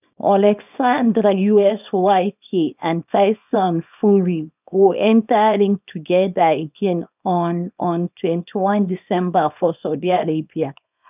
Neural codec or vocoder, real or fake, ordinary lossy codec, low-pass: codec, 24 kHz, 0.9 kbps, WavTokenizer, small release; fake; none; 3.6 kHz